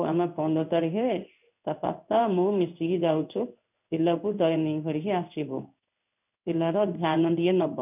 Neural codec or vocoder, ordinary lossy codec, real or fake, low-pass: codec, 16 kHz in and 24 kHz out, 1 kbps, XY-Tokenizer; none; fake; 3.6 kHz